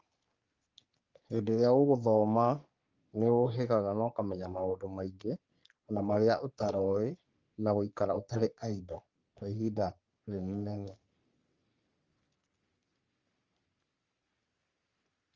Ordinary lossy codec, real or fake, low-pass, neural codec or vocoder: Opus, 32 kbps; fake; 7.2 kHz; codec, 44.1 kHz, 3.4 kbps, Pupu-Codec